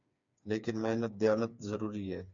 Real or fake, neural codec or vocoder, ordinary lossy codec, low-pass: fake; codec, 16 kHz, 4 kbps, FreqCodec, smaller model; MP3, 64 kbps; 7.2 kHz